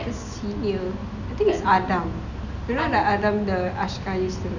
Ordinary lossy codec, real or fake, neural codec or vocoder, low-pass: none; real; none; 7.2 kHz